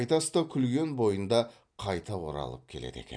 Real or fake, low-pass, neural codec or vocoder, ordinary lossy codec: real; 9.9 kHz; none; none